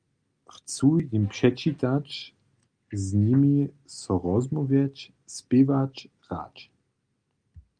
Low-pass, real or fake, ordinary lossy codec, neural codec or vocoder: 9.9 kHz; real; Opus, 32 kbps; none